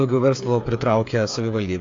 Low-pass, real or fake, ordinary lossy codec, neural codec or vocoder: 7.2 kHz; fake; AAC, 48 kbps; codec, 16 kHz, 8 kbps, FreqCodec, smaller model